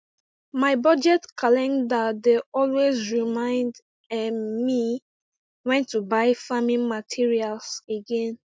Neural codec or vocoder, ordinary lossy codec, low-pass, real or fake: none; none; none; real